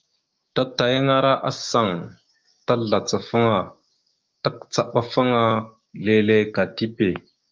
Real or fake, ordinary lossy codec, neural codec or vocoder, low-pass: fake; Opus, 24 kbps; codec, 16 kHz, 6 kbps, DAC; 7.2 kHz